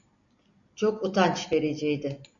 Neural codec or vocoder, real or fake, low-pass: none; real; 7.2 kHz